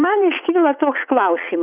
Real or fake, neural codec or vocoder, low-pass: fake; vocoder, 44.1 kHz, 80 mel bands, Vocos; 3.6 kHz